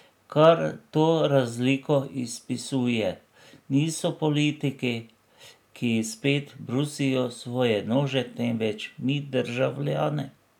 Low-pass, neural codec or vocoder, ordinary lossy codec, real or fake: 19.8 kHz; none; none; real